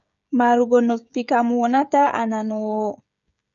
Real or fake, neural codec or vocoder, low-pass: fake; codec, 16 kHz, 16 kbps, FreqCodec, smaller model; 7.2 kHz